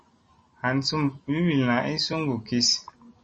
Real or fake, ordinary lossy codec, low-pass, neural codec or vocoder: real; MP3, 32 kbps; 10.8 kHz; none